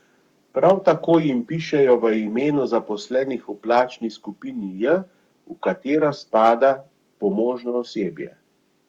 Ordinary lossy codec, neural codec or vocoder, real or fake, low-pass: Opus, 64 kbps; codec, 44.1 kHz, 7.8 kbps, Pupu-Codec; fake; 19.8 kHz